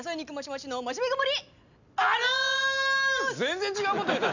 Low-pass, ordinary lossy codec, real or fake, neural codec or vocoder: 7.2 kHz; none; real; none